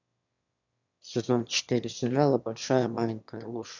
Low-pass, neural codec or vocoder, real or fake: 7.2 kHz; autoencoder, 22.05 kHz, a latent of 192 numbers a frame, VITS, trained on one speaker; fake